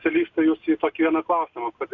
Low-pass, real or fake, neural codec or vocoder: 7.2 kHz; real; none